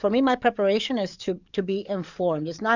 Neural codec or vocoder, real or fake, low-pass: codec, 44.1 kHz, 7.8 kbps, DAC; fake; 7.2 kHz